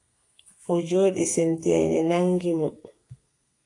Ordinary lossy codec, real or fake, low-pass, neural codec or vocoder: AAC, 48 kbps; fake; 10.8 kHz; codec, 32 kHz, 1.9 kbps, SNAC